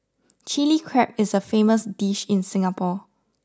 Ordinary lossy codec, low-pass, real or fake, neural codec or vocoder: none; none; real; none